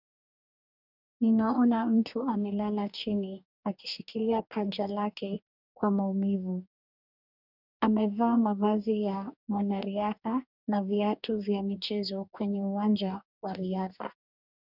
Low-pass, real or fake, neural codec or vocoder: 5.4 kHz; fake; codec, 44.1 kHz, 2.6 kbps, DAC